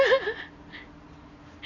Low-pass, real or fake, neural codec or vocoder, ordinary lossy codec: 7.2 kHz; real; none; none